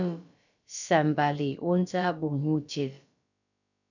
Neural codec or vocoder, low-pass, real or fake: codec, 16 kHz, about 1 kbps, DyCAST, with the encoder's durations; 7.2 kHz; fake